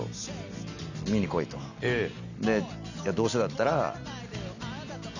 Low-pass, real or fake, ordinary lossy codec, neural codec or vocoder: 7.2 kHz; real; none; none